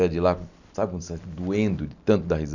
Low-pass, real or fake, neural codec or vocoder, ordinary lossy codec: 7.2 kHz; real; none; none